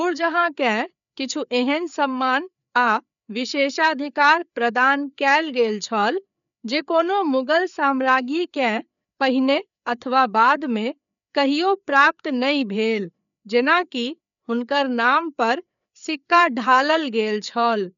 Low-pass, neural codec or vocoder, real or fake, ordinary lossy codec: 7.2 kHz; codec, 16 kHz, 4 kbps, FreqCodec, larger model; fake; none